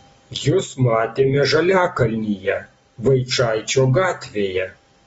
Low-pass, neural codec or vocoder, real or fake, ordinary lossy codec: 19.8 kHz; none; real; AAC, 24 kbps